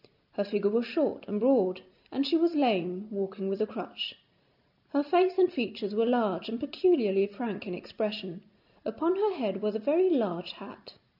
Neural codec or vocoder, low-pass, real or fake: none; 5.4 kHz; real